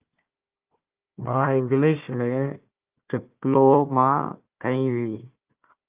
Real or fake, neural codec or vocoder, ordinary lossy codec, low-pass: fake; codec, 16 kHz, 1 kbps, FunCodec, trained on Chinese and English, 50 frames a second; Opus, 32 kbps; 3.6 kHz